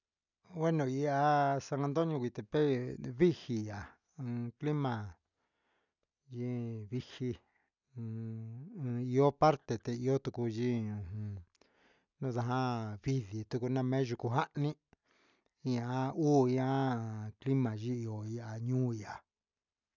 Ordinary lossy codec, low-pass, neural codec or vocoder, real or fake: none; 7.2 kHz; none; real